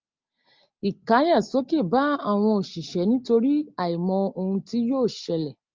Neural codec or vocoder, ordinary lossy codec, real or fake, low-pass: none; Opus, 32 kbps; real; 7.2 kHz